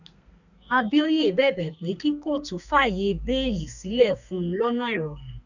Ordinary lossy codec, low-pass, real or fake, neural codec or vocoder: none; 7.2 kHz; fake; codec, 32 kHz, 1.9 kbps, SNAC